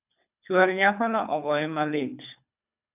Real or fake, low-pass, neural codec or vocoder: fake; 3.6 kHz; codec, 24 kHz, 3 kbps, HILCodec